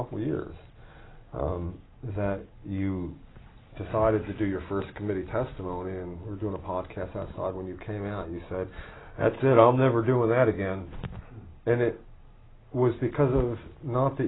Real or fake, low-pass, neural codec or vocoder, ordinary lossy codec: real; 7.2 kHz; none; AAC, 16 kbps